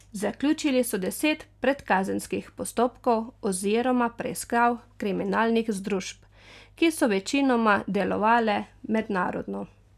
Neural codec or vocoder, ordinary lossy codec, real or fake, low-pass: none; none; real; 14.4 kHz